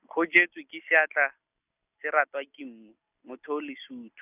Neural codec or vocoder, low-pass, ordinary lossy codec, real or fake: none; 3.6 kHz; none; real